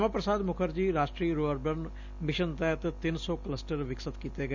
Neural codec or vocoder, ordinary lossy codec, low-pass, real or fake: none; none; 7.2 kHz; real